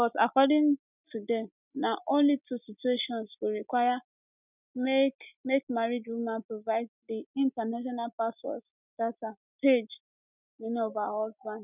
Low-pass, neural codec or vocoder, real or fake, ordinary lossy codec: 3.6 kHz; none; real; none